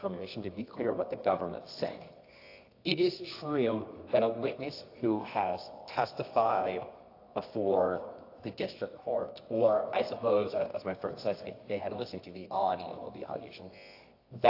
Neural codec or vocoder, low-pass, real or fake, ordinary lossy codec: codec, 24 kHz, 0.9 kbps, WavTokenizer, medium music audio release; 5.4 kHz; fake; AAC, 32 kbps